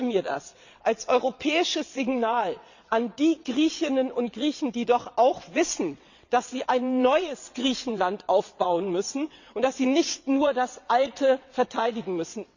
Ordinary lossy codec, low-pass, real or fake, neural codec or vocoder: none; 7.2 kHz; fake; vocoder, 22.05 kHz, 80 mel bands, WaveNeXt